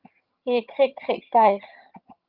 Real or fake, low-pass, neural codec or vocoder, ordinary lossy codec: fake; 5.4 kHz; vocoder, 22.05 kHz, 80 mel bands, HiFi-GAN; Opus, 32 kbps